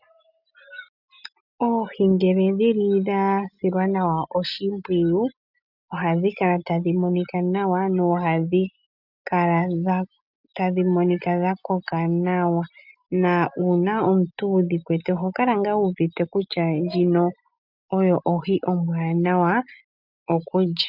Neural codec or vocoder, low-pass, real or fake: none; 5.4 kHz; real